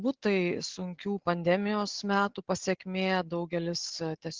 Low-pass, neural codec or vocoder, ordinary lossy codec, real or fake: 7.2 kHz; none; Opus, 16 kbps; real